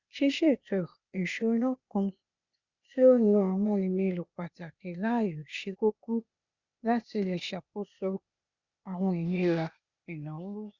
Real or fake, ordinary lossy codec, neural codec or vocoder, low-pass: fake; Opus, 64 kbps; codec, 16 kHz, 0.8 kbps, ZipCodec; 7.2 kHz